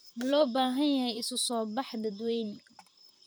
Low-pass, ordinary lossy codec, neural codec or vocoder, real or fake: none; none; vocoder, 44.1 kHz, 128 mel bands, Pupu-Vocoder; fake